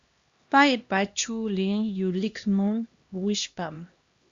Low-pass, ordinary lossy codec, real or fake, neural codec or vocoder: 7.2 kHz; Opus, 64 kbps; fake; codec, 16 kHz, 1 kbps, X-Codec, HuBERT features, trained on LibriSpeech